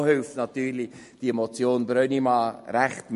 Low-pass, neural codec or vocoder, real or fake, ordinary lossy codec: 14.4 kHz; none; real; MP3, 48 kbps